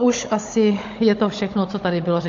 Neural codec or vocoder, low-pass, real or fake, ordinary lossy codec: codec, 16 kHz, 16 kbps, FunCodec, trained on Chinese and English, 50 frames a second; 7.2 kHz; fake; AAC, 48 kbps